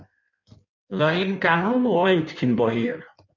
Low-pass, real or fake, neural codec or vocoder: 7.2 kHz; fake; codec, 16 kHz in and 24 kHz out, 1.1 kbps, FireRedTTS-2 codec